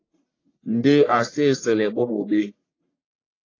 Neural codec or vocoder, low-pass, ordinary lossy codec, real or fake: codec, 44.1 kHz, 1.7 kbps, Pupu-Codec; 7.2 kHz; AAC, 32 kbps; fake